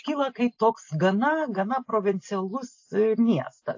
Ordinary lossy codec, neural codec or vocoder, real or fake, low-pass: AAC, 48 kbps; none; real; 7.2 kHz